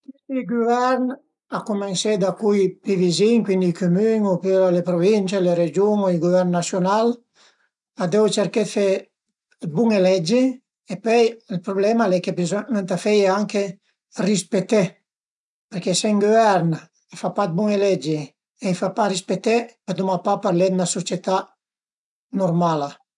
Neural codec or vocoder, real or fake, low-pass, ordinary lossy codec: none; real; 10.8 kHz; none